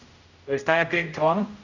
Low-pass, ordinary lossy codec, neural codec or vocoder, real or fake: 7.2 kHz; none; codec, 16 kHz, 0.5 kbps, X-Codec, HuBERT features, trained on general audio; fake